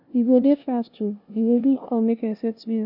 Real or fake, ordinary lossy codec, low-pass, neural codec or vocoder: fake; none; 5.4 kHz; codec, 16 kHz, 0.5 kbps, FunCodec, trained on LibriTTS, 25 frames a second